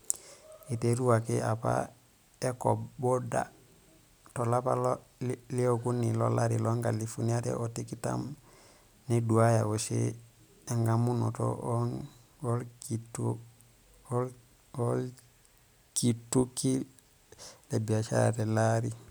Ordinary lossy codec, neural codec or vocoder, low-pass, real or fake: none; none; none; real